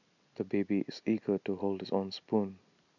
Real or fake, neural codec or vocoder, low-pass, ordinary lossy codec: real; none; 7.2 kHz; none